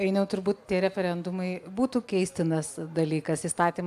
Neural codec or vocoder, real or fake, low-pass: none; real; 14.4 kHz